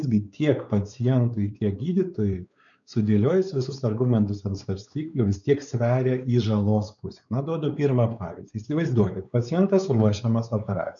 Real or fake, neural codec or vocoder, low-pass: fake; codec, 16 kHz, 4 kbps, X-Codec, WavLM features, trained on Multilingual LibriSpeech; 7.2 kHz